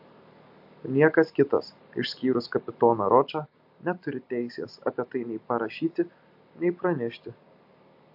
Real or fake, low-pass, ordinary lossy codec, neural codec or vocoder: real; 5.4 kHz; AAC, 48 kbps; none